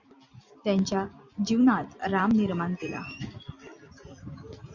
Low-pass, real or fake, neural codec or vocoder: 7.2 kHz; real; none